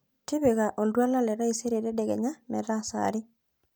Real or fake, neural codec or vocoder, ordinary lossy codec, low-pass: real; none; none; none